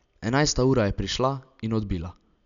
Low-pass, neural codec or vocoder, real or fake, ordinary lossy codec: 7.2 kHz; none; real; none